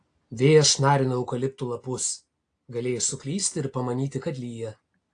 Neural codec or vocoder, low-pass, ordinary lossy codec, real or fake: none; 9.9 kHz; AAC, 48 kbps; real